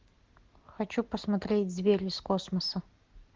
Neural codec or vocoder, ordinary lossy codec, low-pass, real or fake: none; Opus, 16 kbps; 7.2 kHz; real